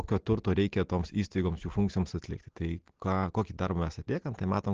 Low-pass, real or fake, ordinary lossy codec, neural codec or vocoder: 7.2 kHz; real; Opus, 16 kbps; none